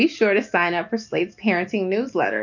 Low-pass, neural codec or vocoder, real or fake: 7.2 kHz; none; real